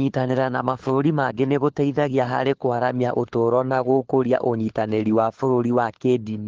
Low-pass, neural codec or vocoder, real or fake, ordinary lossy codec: 7.2 kHz; codec, 16 kHz, 4 kbps, FunCodec, trained on LibriTTS, 50 frames a second; fake; Opus, 16 kbps